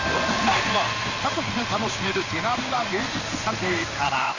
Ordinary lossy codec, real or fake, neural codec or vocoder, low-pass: none; fake; codec, 16 kHz in and 24 kHz out, 1 kbps, XY-Tokenizer; 7.2 kHz